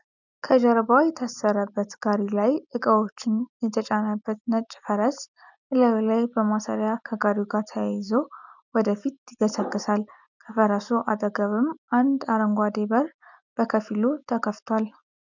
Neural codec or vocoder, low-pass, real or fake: none; 7.2 kHz; real